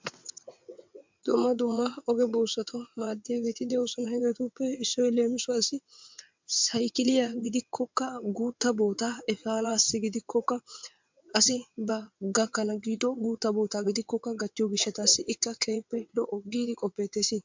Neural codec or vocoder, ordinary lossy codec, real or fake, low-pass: vocoder, 22.05 kHz, 80 mel bands, WaveNeXt; MP3, 64 kbps; fake; 7.2 kHz